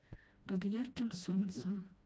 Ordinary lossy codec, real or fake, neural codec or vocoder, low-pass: none; fake; codec, 16 kHz, 1 kbps, FreqCodec, smaller model; none